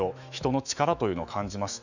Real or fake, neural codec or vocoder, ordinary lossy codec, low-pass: fake; autoencoder, 48 kHz, 128 numbers a frame, DAC-VAE, trained on Japanese speech; none; 7.2 kHz